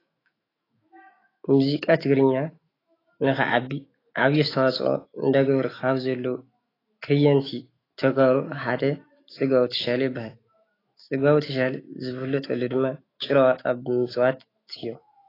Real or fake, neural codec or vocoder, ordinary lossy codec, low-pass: fake; autoencoder, 48 kHz, 128 numbers a frame, DAC-VAE, trained on Japanese speech; AAC, 24 kbps; 5.4 kHz